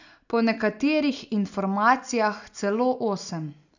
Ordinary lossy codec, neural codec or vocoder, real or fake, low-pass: none; none; real; 7.2 kHz